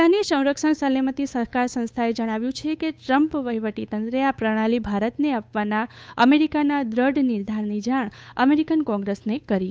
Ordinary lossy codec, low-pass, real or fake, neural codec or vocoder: none; none; fake; codec, 16 kHz, 8 kbps, FunCodec, trained on Chinese and English, 25 frames a second